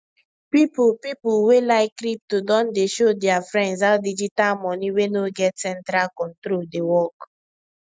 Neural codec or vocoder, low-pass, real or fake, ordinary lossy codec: none; none; real; none